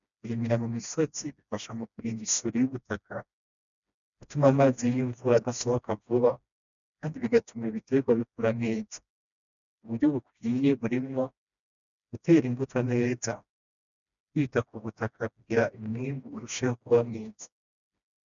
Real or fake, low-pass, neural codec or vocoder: fake; 7.2 kHz; codec, 16 kHz, 1 kbps, FreqCodec, smaller model